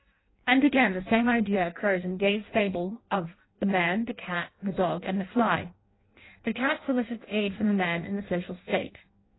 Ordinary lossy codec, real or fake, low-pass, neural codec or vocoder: AAC, 16 kbps; fake; 7.2 kHz; codec, 16 kHz in and 24 kHz out, 0.6 kbps, FireRedTTS-2 codec